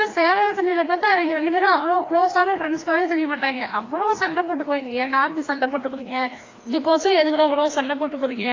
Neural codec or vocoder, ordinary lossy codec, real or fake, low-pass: codec, 16 kHz, 1 kbps, FreqCodec, larger model; AAC, 32 kbps; fake; 7.2 kHz